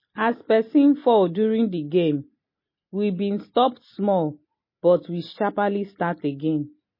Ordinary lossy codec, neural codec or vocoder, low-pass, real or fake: MP3, 24 kbps; none; 5.4 kHz; real